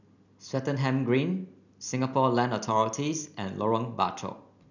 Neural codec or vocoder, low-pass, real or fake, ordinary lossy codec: none; 7.2 kHz; real; none